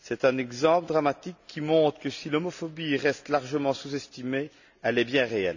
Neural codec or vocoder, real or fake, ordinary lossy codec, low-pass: none; real; none; 7.2 kHz